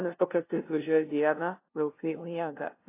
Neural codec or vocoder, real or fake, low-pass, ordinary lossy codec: codec, 16 kHz, 0.5 kbps, FunCodec, trained on LibriTTS, 25 frames a second; fake; 3.6 kHz; AAC, 24 kbps